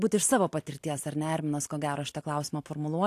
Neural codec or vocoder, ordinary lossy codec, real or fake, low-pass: none; AAC, 64 kbps; real; 14.4 kHz